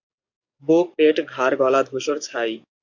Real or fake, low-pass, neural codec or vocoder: fake; 7.2 kHz; codec, 44.1 kHz, 7.8 kbps, DAC